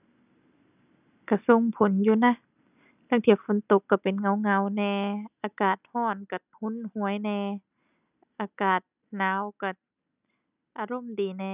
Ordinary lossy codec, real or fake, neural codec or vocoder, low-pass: none; real; none; 3.6 kHz